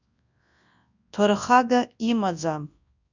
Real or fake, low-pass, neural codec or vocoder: fake; 7.2 kHz; codec, 24 kHz, 0.9 kbps, WavTokenizer, large speech release